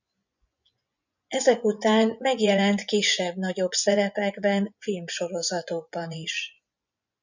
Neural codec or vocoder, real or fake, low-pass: vocoder, 24 kHz, 100 mel bands, Vocos; fake; 7.2 kHz